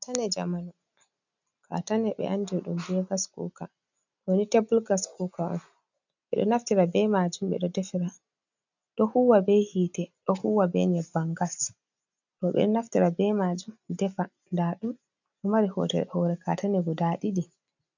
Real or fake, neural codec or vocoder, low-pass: real; none; 7.2 kHz